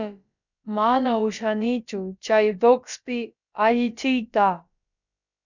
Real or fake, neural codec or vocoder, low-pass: fake; codec, 16 kHz, about 1 kbps, DyCAST, with the encoder's durations; 7.2 kHz